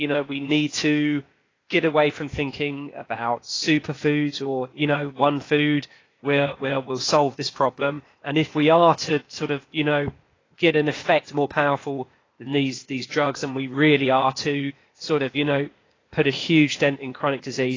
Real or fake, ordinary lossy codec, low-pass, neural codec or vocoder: fake; AAC, 32 kbps; 7.2 kHz; codec, 16 kHz, 0.8 kbps, ZipCodec